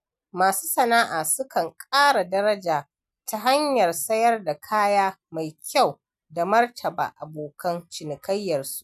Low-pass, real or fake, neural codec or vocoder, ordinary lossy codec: 14.4 kHz; real; none; none